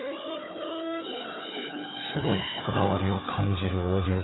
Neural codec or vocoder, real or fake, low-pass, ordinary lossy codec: codec, 16 kHz, 4 kbps, FunCodec, trained on Chinese and English, 50 frames a second; fake; 7.2 kHz; AAC, 16 kbps